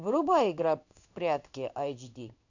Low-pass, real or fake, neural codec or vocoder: 7.2 kHz; fake; codec, 16 kHz in and 24 kHz out, 1 kbps, XY-Tokenizer